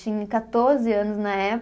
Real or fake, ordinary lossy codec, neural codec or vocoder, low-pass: real; none; none; none